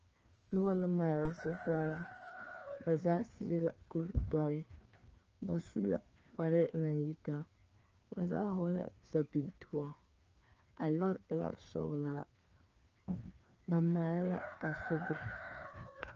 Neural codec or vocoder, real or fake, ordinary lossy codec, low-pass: codec, 16 kHz, 2 kbps, FreqCodec, larger model; fake; Opus, 24 kbps; 7.2 kHz